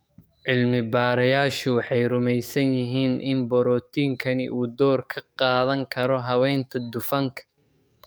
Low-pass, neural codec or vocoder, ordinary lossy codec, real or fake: none; codec, 44.1 kHz, 7.8 kbps, DAC; none; fake